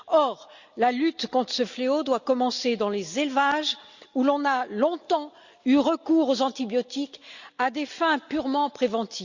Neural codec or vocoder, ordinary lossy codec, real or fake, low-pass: none; Opus, 64 kbps; real; 7.2 kHz